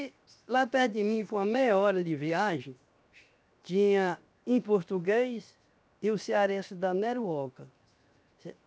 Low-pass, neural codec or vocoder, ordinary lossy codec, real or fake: none; codec, 16 kHz, 0.7 kbps, FocalCodec; none; fake